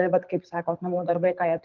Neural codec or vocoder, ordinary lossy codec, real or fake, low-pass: codec, 24 kHz, 6 kbps, HILCodec; Opus, 24 kbps; fake; 7.2 kHz